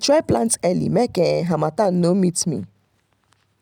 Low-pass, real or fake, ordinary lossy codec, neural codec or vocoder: 19.8 kHz; real; none; none